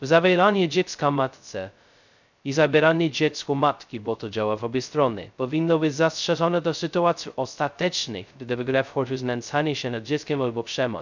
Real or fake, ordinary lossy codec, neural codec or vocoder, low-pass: fake; none; codec, 16 kHz, 0.2 kbps, FocalCodec; 7.2 kHz